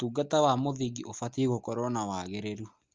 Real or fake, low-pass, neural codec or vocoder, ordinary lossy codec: real; 7.2 kHz; none; Opus, 24 kbps